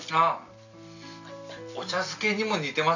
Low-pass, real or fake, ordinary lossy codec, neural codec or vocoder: 7.2 kHz; real; none; none